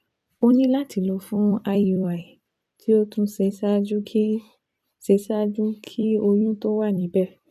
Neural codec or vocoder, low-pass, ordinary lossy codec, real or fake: vocoder, 44.1 kHz, 128 mel bands every 256 samples, BigVGAN v2; 14.4 kHz; none; fake